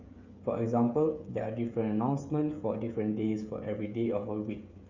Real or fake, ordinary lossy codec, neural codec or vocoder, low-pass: fake; Opus, 64 kbps; codec, 16 kHz, 16 kbps, FreqCodec, smaller model; 7.2 kHz